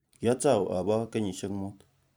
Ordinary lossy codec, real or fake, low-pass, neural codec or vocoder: none; fake; none; vocoder, 44.1 kHz, 128 mel bands every 256 samples, BigVGAN v2